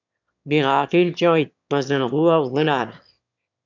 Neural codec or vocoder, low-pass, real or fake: autoencoder, 22.05 kHz, a latent of 192 numbers a frame, VITS, trained on one speaker; 7.2 kHz; fake